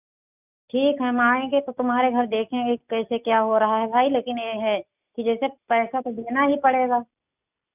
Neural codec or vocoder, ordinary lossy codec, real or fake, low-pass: none; none; real; 3.6 kHz